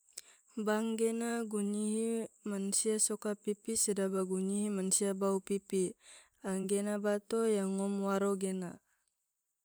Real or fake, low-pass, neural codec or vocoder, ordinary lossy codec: fake; none; vocoder, 44.1 kHz, 128 mel bands every 512 samples, BigVGAN v2; none